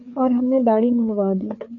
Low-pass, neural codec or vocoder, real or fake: 7.2 kHz; codec, 16 kHz, 4 kbps, FreqCodec, larger model; fake